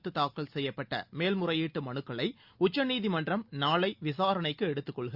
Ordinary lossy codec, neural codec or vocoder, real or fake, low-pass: Opus, 64 kbps; none; real; 5.4 kHz